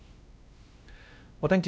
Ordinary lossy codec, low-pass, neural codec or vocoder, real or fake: none; none; codec, 16 kHz, 1 kbps, X-Codec, WavLM features, trained on Multilingual LibriSpeech; fake